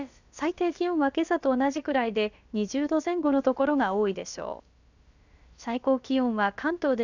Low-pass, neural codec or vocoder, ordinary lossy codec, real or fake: 7.2 kHz; codec, 16 kHz, about 1 kbps, DyCAST, with the encoder's durations; none; fake